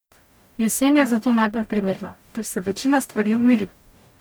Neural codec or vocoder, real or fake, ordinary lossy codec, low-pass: codec, 44.1 kHz, 0.9 kbps, DAC; fake; none; none